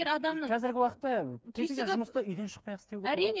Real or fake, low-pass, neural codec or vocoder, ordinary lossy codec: fake; none; codec, 16 kHz, 4 kbps, FreqCodec, smaller model; none